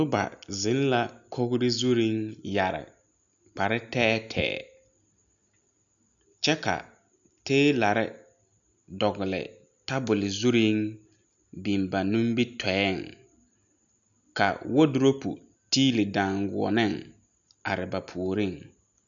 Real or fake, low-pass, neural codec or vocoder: real; 7.2 kHz; none